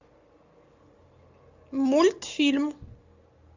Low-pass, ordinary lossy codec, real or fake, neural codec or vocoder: 7.2 kHz; AAC, 48 kbps; real; none